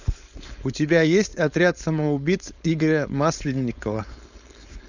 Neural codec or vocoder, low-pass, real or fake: codec, 16 kHz, 4.8 kbps, FACodec; 7.2 kHz; fake